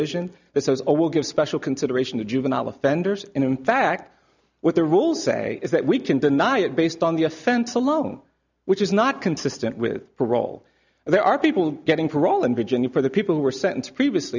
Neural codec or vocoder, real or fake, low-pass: none; real; 7.2 kHz